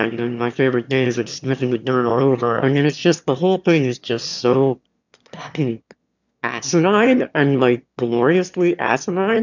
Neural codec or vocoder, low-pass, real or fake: autoencoder, 22.05 kHz, a latent of 192 numbers a frame, VITS, trained on one speaker; 7.2 kHz; fake